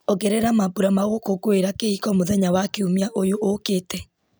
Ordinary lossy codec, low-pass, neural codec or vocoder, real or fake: none; none; none; real